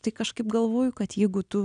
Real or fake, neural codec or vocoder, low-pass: real; none; 9.9 kHz